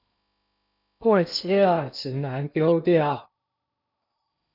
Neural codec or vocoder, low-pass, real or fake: codec, 16 kHz in and 24 kHz out, 0.6 kbps, FocalCodec, streaming, 2048 codes; 5.4 kHz; fake